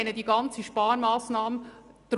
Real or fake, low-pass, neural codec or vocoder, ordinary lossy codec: real; 10.8 kHz; none; none